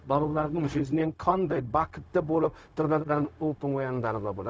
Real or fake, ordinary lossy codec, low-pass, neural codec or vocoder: fake; none; none; codec, 16 kHz, 0.4 kbps, LongCat-Audio-Codec